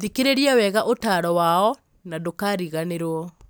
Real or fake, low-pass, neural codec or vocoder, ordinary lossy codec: real; none; none; none